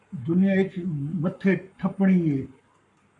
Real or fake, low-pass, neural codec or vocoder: fake; 10.8 kHz; codec, 44.1 kHz, 7.8 kbps, Pupu-Codec